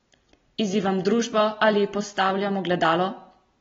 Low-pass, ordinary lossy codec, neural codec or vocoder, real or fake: 7.2 kHz; AAC, 24 kbps; none; real